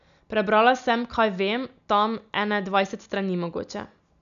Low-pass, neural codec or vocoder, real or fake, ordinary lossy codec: 7.2 kHz; none; real; none